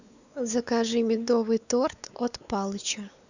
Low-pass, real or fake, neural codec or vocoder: 7.2 kHz; fake; codec, 16 kHz, 4 kbps, X-Codec, WavLM features, trained on Multilingual LibriSpeech